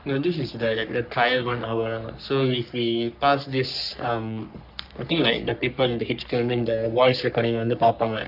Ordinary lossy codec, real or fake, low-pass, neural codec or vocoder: none; fake; 5.4 kHz; codec, 44.1 kHz, 3.4 kbps, Pupu-Codec